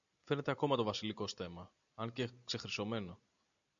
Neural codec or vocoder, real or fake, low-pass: none; real; 7.2 kHz